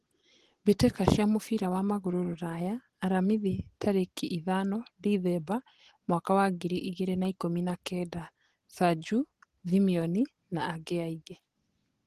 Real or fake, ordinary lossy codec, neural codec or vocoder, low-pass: real; Opus, 16 kbps; none; 14.4 kHz